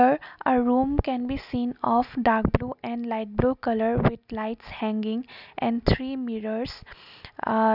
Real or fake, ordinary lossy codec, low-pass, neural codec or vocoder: real; none; 5.4 kHz; none